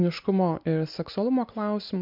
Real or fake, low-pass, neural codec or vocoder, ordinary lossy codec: real; 5.4 kHz; none; MP3, 48 kbps